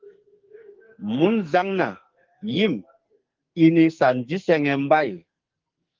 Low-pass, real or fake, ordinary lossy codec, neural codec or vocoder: 7.2 kHz; fake; Opus, 32 kbps; codec, 44.1 kHz, 2.6 kbps, SNAC